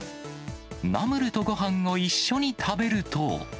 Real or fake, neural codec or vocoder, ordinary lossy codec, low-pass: real; none; none; none